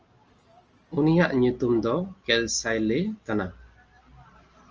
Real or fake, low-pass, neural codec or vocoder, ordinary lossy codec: real; 7.2 kHz; none; Opus, 24 kbps